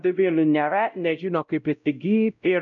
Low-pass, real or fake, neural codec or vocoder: 7.2 kHz; fake; codec, 16 kHz, 0.5 kbps, X-Codec, WavLM features, trained on Multilingual LibriSpeech